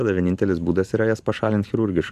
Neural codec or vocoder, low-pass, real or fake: vocoder, 44.1 kHz, 128 mel bands every 512 samples, BigVGAN v2; 14.4 kHz; fake